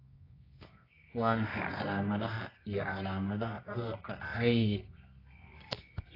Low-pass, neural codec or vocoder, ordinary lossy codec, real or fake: 5.4 kHz; codec, 24 kHz, 0.9 kbps, WavTokenizer, medium music audio release; AAC, 24 kbps; fake